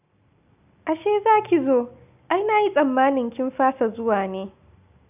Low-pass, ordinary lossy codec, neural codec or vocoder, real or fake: 3.6 kHz; none; none; real